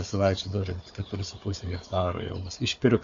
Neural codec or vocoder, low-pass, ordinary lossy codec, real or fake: codec, 16 kHz, 4 kbps, FunCodec, trained on Chinese and English, 50 frames a second; 7.2 kHz; MP3, 48 kbps; fake